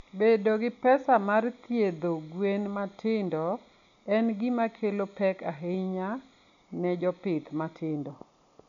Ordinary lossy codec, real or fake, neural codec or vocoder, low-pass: none; real; none; 7.2 kHz